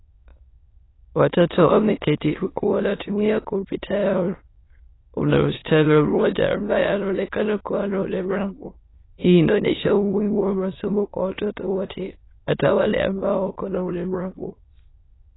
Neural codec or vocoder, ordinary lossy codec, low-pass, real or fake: autoencoder, 22.05 kHz, a latent of 192 numbers a frame, VITS, trained on many speakers; AAC, 16 kbps; 7.2 kHz; fake